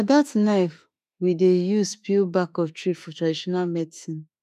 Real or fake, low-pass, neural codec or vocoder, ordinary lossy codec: fake; 14.4 kHz; autoencoder, 48 kHz, 32 numbers a frame, DAC-VAE, trained on Japanese speech; none